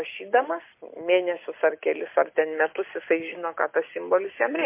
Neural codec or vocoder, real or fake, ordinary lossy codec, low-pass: none; real; MP3, 24 kbps; 3.6 kHz